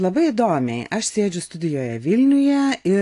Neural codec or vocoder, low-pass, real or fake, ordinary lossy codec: none; 10.8 kHz; real; AAC, 48 kbps